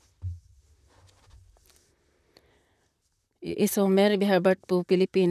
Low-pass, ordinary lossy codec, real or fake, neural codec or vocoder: 14.4 kHz; none; fake; vocoder, 44.1 kHz, 128 mel bands, Pupu-Vocoder